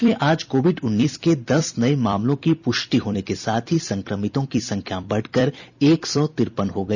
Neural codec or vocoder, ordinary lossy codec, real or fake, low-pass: vocoder, 44.1 kHz, 128 mel bands every 256 samples, BigVGAN v2; none; fake; 7.2 kHz